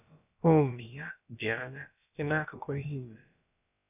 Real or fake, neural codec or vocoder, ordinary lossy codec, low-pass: fake; codec, 16 kHz, about 1 kbps, DyCAST, with the encoder's durations; none; 3.6 kHz